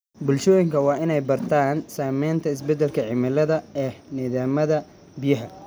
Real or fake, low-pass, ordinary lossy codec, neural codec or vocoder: real; none; none; none